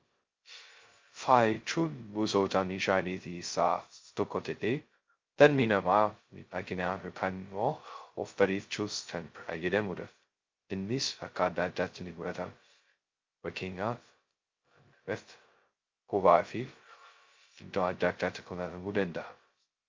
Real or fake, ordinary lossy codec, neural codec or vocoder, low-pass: fake; Opus, 32 kbps; codec, 16 kHz, 0.2 kbps, FocalCodec; 7.2 kHz